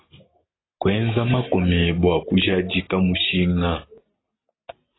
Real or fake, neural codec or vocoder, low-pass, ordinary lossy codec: real; none; 7.2 kHz; AAC, 16 kbps